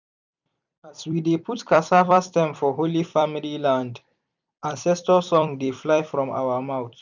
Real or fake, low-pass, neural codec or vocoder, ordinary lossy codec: fake; 7.2 kHz; vocoder, 44.1 kHz, 128 mel bands every 256 samples, BigVGAN v2; none